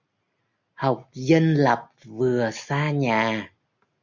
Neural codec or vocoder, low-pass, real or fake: none; 7.2 kHz; real